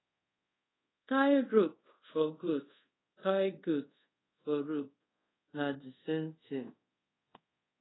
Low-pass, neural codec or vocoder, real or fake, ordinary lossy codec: 7.2 kHz; codec, 24 kHz, 0.5 kbps, DualCodec; fake; AAC, 16 kbps